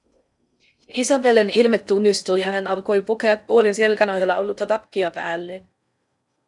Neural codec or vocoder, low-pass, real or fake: codec, 16 kHz in and 24 kHz out, 0.6 kbps, FocalCodec, streaming, 4096 codes; 10.8 kHz; fake